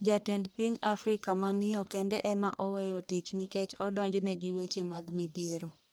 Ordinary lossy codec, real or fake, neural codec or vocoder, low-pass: none; fake; codec, 44.1 kHz, 1.7 kbps, Pupu-Codec; none